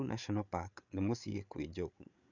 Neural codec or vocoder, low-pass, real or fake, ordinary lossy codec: codec, 16 kHz, 8 kbps, FreqCodec, larger model; 7.2 kHz; fake; none